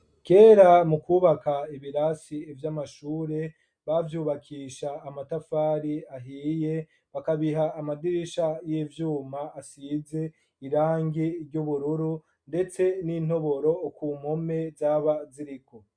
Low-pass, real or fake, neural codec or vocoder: 9.9 kHz; real; none